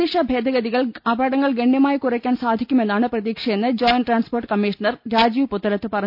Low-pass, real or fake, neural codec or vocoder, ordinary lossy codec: 5.4 kHz; real; none; none